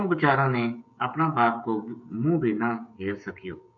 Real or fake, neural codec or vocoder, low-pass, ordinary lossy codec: fake; codec, 16 kHz, 16 kbps, FreqCodec, smaller model; 7.2 kHz; MP3, 48 kbps